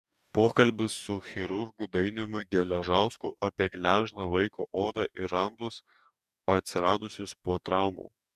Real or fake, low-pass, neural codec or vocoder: fake; 14.4 kHz; codec, 44.1 kHz, 2.6 kbps, DAC